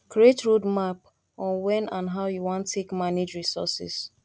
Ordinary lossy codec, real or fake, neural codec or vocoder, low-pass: none; real; none; none